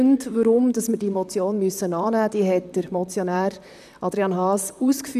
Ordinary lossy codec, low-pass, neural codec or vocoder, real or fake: none; 14.4 kHz; vocoder, 44.1 kHz, 128 mel bands, Pupu-Vocoder; fake